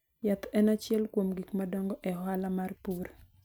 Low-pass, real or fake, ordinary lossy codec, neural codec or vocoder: none; real; none; none